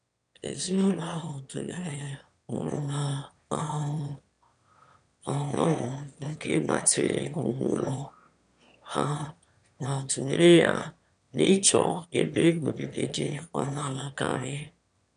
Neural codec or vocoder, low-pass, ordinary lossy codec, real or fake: autoencoder, 22.05 kHz, a latent of 192 numbers a frame, VITS, trained on one speaker; 9.9 kHz; none; fake